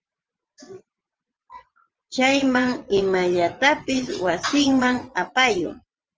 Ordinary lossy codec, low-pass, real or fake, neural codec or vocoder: Opus, 24 kbps; 7.2 kHz; fake; vocoder, 22.05 kHz, 80 mel bands, WaveNeXt